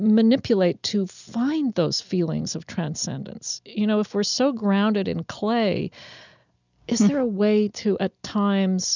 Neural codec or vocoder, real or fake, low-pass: none; real; 7.2 kHz